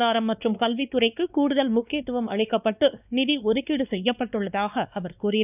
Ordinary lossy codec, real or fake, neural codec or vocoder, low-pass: none; fake; codec, 16 kHz, 2 kbps, X-Codec, WavLM features, trained on Multilingual LibriSpeech; 3.6 kHz